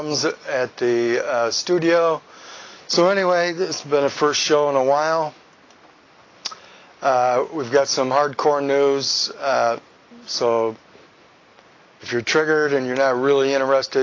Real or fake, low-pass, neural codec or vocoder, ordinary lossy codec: real; 7.2 kHz; none; AAC, 32 kbps